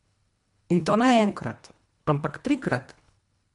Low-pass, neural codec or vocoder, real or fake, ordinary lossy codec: 10.8 kHz; codec, 24 kHz, 1.5 kbps, HILCodec; fake; MP3, 64 kbps